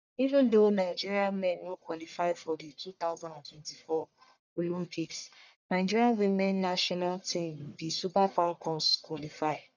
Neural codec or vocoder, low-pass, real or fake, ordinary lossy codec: codec, 44.1 kHz, 1.7 kbps, Pupu-Codec; 7.2 kHz; fake; none